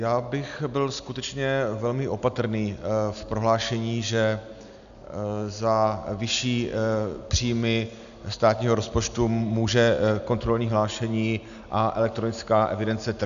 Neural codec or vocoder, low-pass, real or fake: none; 7.2 kHz; real